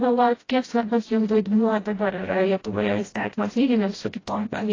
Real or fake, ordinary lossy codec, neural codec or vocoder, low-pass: fake; AAC, 32 kbps; codec, 16 kHz, 0.5 kbps, FreqCodec, smaller model; 7.2 kHz